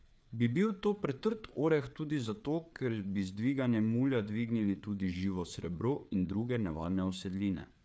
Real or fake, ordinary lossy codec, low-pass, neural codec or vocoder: fake; none; none; codec, 16 kHz, 4 kbps, FreqCodec, larger model